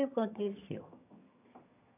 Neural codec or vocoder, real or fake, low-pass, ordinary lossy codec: vocoder, 22.05 kHz, 80 mel bands, HiFi-GAN; fake; 3.6 kHz; none